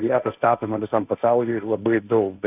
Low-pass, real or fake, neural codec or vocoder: 3.6 kHz; fake; codec, 16 kHz, 1.1 kbps, Voila-Tokenizer